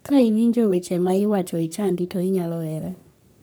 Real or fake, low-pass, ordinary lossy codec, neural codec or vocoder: fake; none; none; codec, 44.1 kHz, 3.4 kbps, Pupu-Codec